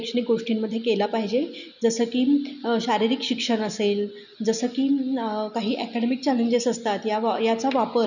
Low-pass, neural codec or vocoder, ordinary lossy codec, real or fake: 7.2 kHz; none; none; real